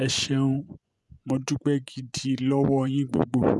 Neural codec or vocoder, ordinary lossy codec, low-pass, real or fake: vocoder, 24 kHz, 100 mel bands, Vocos; none; none; fake